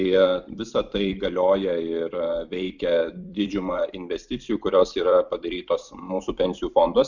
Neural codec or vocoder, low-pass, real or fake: vocoder, 44.1 kHz, 128 mel bands every 256 samples, BigVGAN v2; 7.2 kHz; fake